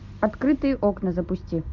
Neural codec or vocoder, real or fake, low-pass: none; real; 7.2 kHz